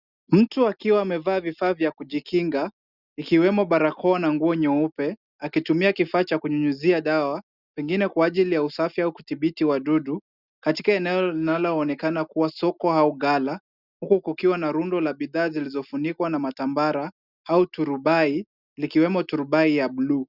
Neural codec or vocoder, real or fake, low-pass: none; real; 5.4 kHz